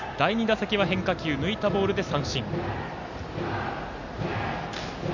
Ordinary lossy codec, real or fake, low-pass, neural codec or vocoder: none; real; 7.2 kHz; none